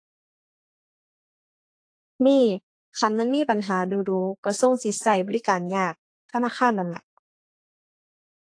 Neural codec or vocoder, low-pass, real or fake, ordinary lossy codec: codec, 32 kHz, 1.9 kbps, SNAC; 9.9 kHz; fake; AAC, 48 kbps